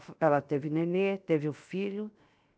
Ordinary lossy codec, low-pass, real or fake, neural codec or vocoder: none; none; fake; codec, 16 kHz, 0.7 kbps, FocalCodec